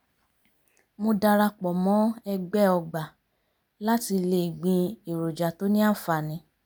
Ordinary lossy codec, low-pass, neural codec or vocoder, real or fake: none; none; none; real